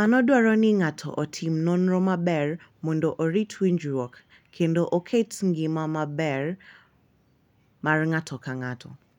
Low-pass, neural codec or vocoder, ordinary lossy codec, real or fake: 19.8 kHz; none; none; real